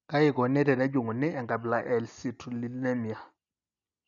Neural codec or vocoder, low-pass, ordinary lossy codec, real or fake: none; 7.2 kHz; none; real